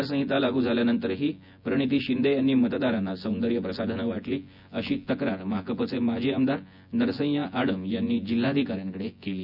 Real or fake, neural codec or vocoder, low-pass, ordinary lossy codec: fake; vocoder, 24 kHz, 100 mel bands, Vocos; 5.4 kHz; none